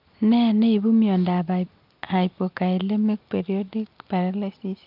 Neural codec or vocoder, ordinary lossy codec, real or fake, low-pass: none; Opus, 24 kbps; real; 5.4 kHz